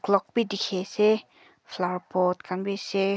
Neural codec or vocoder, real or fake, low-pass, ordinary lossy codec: none; real; none; none